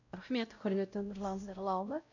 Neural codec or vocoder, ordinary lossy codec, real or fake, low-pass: codec, 16 kHz, 0.5 kbps, X-Codec, WavLM features, trained on Multilingual LibriSpeech; none; fake; 7.2 kHz